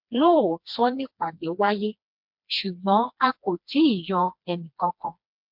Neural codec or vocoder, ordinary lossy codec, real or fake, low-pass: codec, 16 kHz, 2 kbps, FreqCodec, smaller model; MP3, 48 kbps; fake; 5.4 kHz